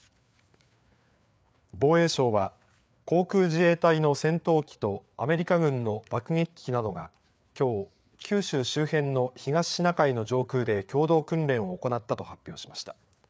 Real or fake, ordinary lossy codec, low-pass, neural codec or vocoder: fake; none; none; codec, 16 kHz, 4 kbps, FreqCodec, larger model